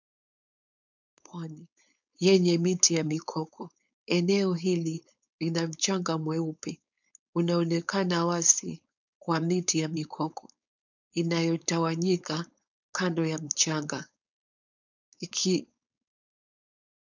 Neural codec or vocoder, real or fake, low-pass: codec, 16 kHz, 4.8 kbps, FACodec; fake; 7.2 kHz